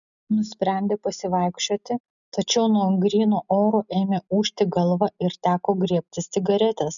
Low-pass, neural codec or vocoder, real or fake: 7.2 kHz; none; real